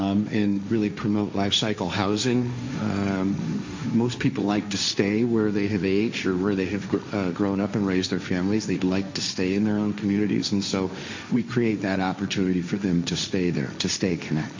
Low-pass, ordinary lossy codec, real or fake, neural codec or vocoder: 7.2 kHz; MP3, 64 kbps; fake; codec, 16 kHz, 1.1 kbps, Voila-Tokenizer